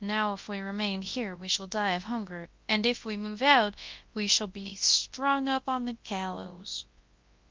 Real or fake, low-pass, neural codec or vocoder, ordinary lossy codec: fake; 7.2 kHz; codec, 24 kHz, 0.9 kbps, WavTokenizer, large speech release; Opus, 24 kbps